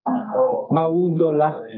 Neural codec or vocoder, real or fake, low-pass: codec, 32 kHz, 1.9 kbps, SNAC; fake; 5.4 kHz